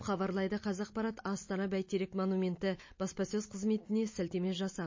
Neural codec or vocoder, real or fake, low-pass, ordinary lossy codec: none; real; 7.2 kHz; MP3, 32 kbps